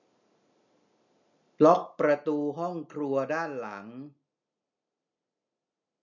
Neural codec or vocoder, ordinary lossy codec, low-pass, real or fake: none; none; 7.2 kHz; real